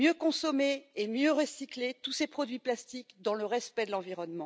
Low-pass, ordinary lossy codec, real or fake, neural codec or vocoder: none; none; real; none